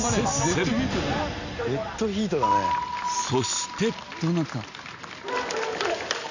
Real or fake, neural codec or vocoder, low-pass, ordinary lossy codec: real; none; 7.2 kHz; none